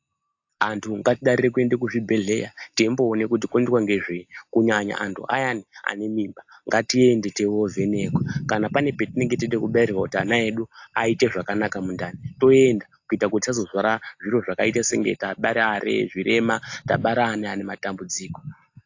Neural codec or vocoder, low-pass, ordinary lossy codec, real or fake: none; 7.2 kHz; AAC, 48 kbps; real